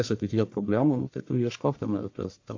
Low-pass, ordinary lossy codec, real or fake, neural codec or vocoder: 7.2 kHz; AAC, 48 kbps; fake; codec, 44.1 kHz, 1.7 kbps, Pupu-Codec